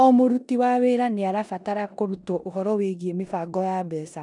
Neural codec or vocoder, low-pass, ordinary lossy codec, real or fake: codec, 16 kHz in and 24 kHz out, 0.9 kbps, LongCat-Audio-Codec, four codebook decoder; 10.8 kHz; none; fake